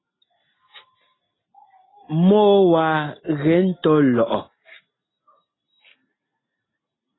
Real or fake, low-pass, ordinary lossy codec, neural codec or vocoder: real; 7.2 kHz; AAC, 16 kbps; none